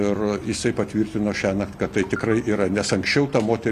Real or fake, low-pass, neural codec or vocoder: real; 14.4 kHz; none